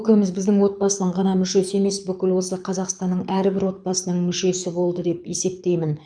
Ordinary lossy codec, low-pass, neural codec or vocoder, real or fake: none; 9.9 kHz; codec, 24 kHz, 6 kbps, HILCodec; fake